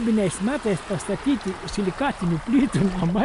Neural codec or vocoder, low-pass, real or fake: none; 10.8 kHz; real